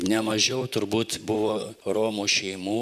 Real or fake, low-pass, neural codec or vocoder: fake; 14.4 kHz; vocoder, 44.1 kHz, 128 mel bands, Pupu-Vocoder